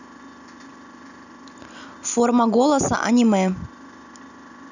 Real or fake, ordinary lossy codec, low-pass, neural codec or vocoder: real; none; 7.2 kHz; none